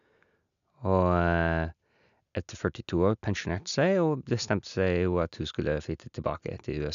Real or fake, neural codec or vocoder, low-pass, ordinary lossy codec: real; none; 7.2 kHz; none